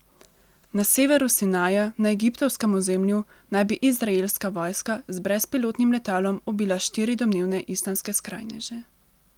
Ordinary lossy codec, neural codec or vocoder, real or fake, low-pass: Opus, 32 kbps; none; real; 19.8 kHz